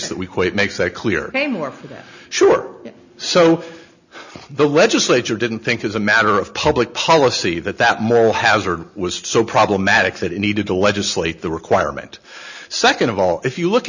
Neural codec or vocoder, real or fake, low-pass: none; real; 7.2 kHz